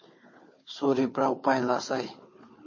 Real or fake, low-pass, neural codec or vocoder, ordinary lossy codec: fake; 7.2 kHz; codec, 16 kHz, 4 kbps, FunCodec, trained on Chinese and English, 50 frames a second; MP3, 32 kbps